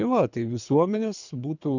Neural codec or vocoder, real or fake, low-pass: codec, 24 kHz, 3 kbps, HILCodec; fake; 7.2 kHz